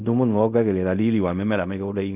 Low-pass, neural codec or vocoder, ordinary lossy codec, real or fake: 3.6 kHz; codec, 16 kHz in and 24 kHz out, 0.4 kbps, LongCat-Audio-Codec, fine tuned four codebook decoder; none; fake